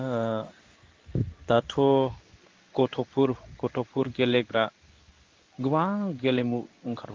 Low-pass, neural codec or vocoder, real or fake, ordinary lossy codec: 7.2 kHz; codec, 16 kHz in and 24 kHz out, 1 kbps, XY-Tokenizer; fake; Opus, 32 kbps